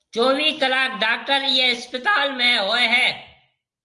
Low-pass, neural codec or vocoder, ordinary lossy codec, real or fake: 10.8 kHz; none; Opus, 24 kbps; real